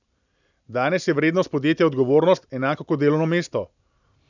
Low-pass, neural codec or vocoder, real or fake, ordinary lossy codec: 7.2 kHz; none; real; none